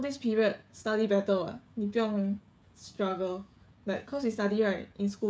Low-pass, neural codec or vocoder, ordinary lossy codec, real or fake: none; codec, 16 kHz, 16 kbps, FreqCodec, smaller model; none; fake